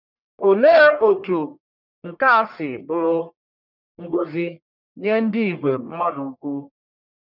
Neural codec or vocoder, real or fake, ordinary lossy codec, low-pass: codec, 44.1 kHz, 1.7 kbps, Pupu-Codec; fake; none; 5.4 kHz